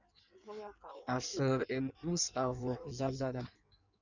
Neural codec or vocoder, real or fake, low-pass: codec, 16 kHz in and 24 kHz out, 1.1 kbps, FireRedTTS-2 codec; fake; 7.2 kHz